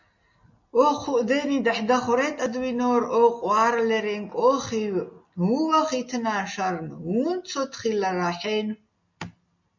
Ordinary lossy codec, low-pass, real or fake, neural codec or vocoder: MP3, 48 kbps; 7.2 kHz; real; none